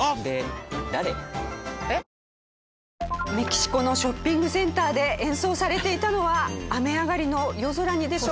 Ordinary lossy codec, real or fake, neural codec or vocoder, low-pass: none; real; none; none